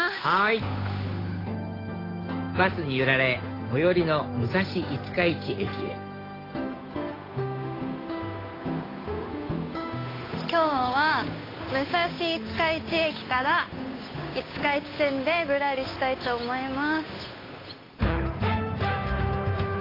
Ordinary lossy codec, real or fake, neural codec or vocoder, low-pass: AAC, 24 kbps; fake; codec, 16 kHz, 8 kbps, FunCodec, trained on Chinese and English, 25 frames a second; 5.4 kHz